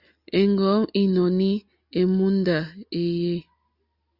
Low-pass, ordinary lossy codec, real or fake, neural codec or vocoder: 5.4 kHz; Opus, 64 kbps; real; none